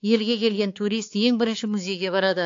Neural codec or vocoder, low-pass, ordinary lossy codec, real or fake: codec, 16 kHz, 4 kbps, X-Codec, HuBERT features, trained on balanced general audio; 7.2 kHz; AAC, 48 kbps; fake